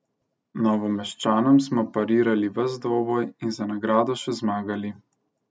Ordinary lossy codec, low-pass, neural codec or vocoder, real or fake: none; none; none; real